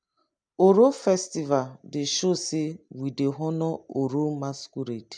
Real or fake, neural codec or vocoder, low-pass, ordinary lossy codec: real; none; 9.9 kHz; none